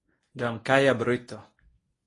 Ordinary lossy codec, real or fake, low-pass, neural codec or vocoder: AAC, 32 kbps; fake; 10.8 kHz; codec, 24 kHz, 0.9 kbps, WavTokenizer, medium speech release version 1